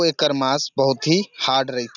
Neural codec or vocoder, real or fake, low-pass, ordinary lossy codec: none; real; 7.2 kHz; none